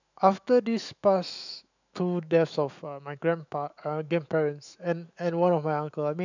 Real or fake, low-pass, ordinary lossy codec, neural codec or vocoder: fake; 7.2 kHz; none; autoencoder, 48 kHz, 128 numbers a frame, DAC-VAE, trained on Japanese speech